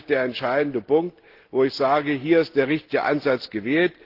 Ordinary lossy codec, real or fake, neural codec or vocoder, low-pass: Opus, 16 kbps; real; none; 5.4 kHz